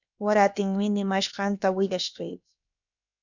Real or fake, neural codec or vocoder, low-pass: fake; codec, 16 kHz, about 1 kbps, DyCAST, with the encoder's durations; 7.2 kHz